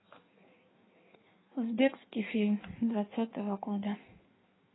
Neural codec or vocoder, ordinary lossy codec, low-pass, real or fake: codec, 16 kHz in and 24 kHz out, 1.1 kbps, FireRedTTS-2 codec; AAC, 16 kbps; 7.2 kHz; fake